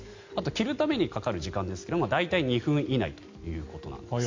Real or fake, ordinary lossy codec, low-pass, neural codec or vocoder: real; MP3, 48 kbps; 7.2 kHz; none